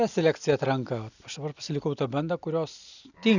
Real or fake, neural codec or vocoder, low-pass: real; none; 7.2 kHz